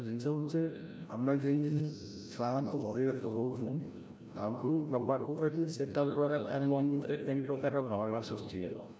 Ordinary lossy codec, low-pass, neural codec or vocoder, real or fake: none; none; codec, 16 kHz, 0.5 kbps, FreqCodec, larger model; fake